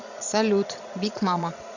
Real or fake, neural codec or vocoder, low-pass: fake; vocoder, 22.05 kHz, 80 mel bands, Vocos; 7.2 kHz